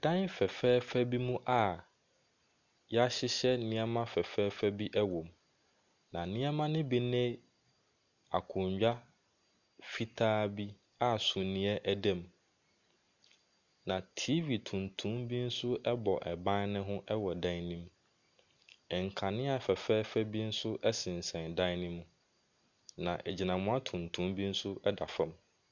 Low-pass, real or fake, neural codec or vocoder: 7.2 kHz; real; none